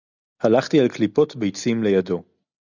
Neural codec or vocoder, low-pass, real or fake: none; 7.2 kHz; real